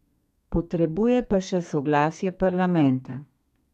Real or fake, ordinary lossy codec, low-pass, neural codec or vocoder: fake; none; 14.4 kHz; codec, 32 kHz, 1.9 kbps, SNAC